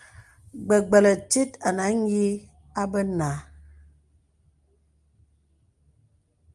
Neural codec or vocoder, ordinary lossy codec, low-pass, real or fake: none; Opus, 32 kbps; 10.8 kHz; real